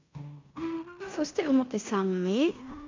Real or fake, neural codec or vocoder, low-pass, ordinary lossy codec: fake; codec, 16 kHz in and 24 kHz out, 0.9 kbps, LongCat-Audio-Codec, fine tuned four codebook decoder; 7.2 kHz; MP3, 64 kbps